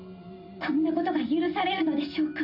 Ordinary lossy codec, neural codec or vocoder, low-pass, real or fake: none; none; 5.4 kHz; real